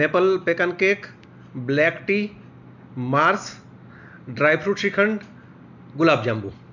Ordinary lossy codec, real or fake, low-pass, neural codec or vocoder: none; real; 7.2 kHz; none